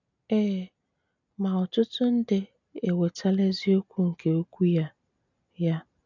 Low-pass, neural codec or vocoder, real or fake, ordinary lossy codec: 7.2 kHz; none; real; none